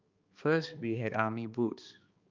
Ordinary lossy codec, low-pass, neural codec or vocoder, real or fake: Opus, 24 kbps; 7.2 kHz; codec, 16 kHz, 2 kbps, X-Codec, HuBERT features, trained on balanced general audio; fake